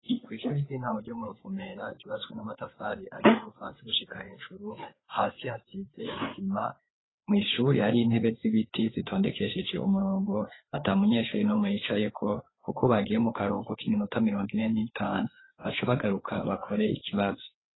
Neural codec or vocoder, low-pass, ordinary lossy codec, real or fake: codec, 16 kHz, 4 kbps, FreqCodec, larger model; 7.2 kHz; AAC, 16 kbps; fake